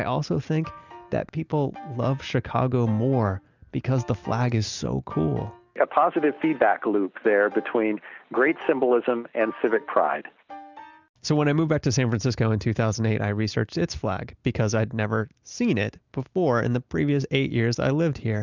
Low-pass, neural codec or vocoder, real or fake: 7.2 kHz; none; real